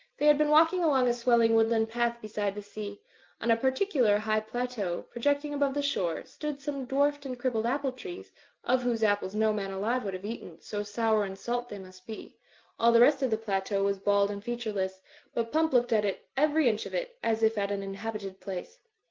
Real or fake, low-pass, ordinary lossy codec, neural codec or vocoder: real; 7.2 kHz; Opus, 32 kbps; none